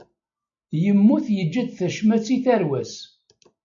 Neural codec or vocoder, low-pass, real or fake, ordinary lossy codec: none; 7.2 kHz; real; MP3, 64 kbps